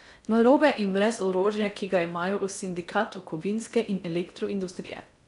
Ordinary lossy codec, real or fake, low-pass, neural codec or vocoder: none; fake; 10.8 kHz; codec, 16 kHz in and 24 kHz out, 0.6 kbps, FocalCodec, streaming, 4096 codes